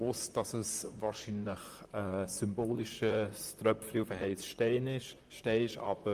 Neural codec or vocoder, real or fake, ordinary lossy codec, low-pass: vocoder, 44.1 kHz, 128 mel bands, Pupu-Vocoder; fake; Opus, 32 kbps; 14.4 kHz